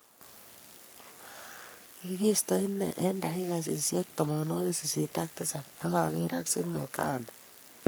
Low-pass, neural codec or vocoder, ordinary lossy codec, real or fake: none; codec, 44.1 kHz, 3.4 kbps, Pupu-Codec; none; fake